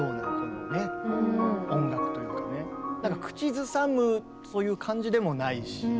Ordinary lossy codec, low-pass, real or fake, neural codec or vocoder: none; none; real; none